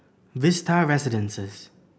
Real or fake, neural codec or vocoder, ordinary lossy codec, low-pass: real; none; none; none